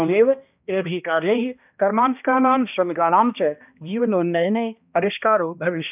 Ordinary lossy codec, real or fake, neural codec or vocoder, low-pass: none; fake; codec, 16 kHz, 1 kbps, X-Codec, HuBERT features, trained on balanced general audio; 3.6 kHz